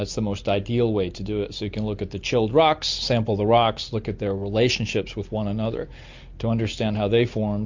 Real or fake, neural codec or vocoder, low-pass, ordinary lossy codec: real; none; 7.2 kHz; MP3, 48 kbps